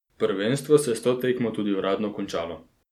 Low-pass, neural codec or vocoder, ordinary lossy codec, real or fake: 19.8 kHz; none; none; real